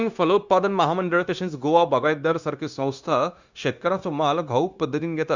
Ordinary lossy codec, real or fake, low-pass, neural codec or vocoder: Opus, 64 kbps; fake; 7.2 kHz; codec, 16 kHz, 0.9 kbps, LongCat-Audio-Codec